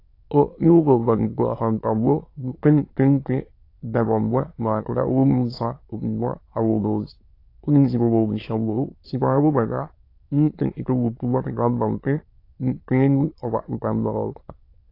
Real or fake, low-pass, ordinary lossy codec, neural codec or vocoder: fake; 5.4 kHz; AAC, 32 kbps; autoencoder, 22.05 kHz, a latent of 192 numbers a frame, VITS, trained on many speakers